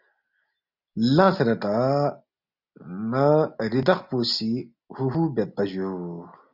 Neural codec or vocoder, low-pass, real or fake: none; 5.4 kHz; real